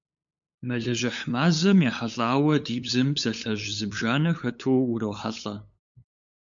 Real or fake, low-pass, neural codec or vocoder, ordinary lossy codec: fake; 7.2 kHz; codec, 16 kHz, 8 kbps, FunCodec, trained on LibriTTS, 25 frames a second; MP3, 64 kbps